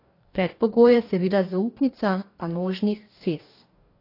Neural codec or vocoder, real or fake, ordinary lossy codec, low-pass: codec, 44.1 kHz, 2.6 kbps, DAC; fake; AAC, 32 kbps; 5.4 kHz